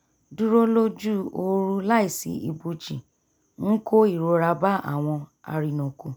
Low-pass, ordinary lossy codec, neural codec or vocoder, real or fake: none; none; none; real